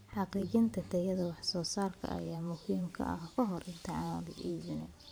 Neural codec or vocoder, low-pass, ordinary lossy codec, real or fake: vocoder, 44.1 kHz, 128 mel bands every 512 samples, BigVGAN v2; none; none; fake